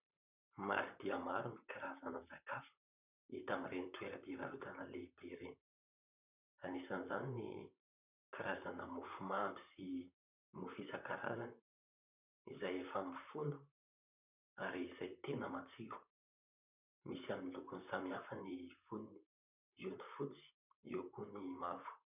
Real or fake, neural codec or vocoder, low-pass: fake; vocoder, 44.1 kHz, 128 mel bands, Pupu-Vocoder; 3.6 kHz